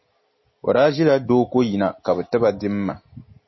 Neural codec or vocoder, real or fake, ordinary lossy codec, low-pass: none; real; MP3, 24 kbps; 7.2 kHz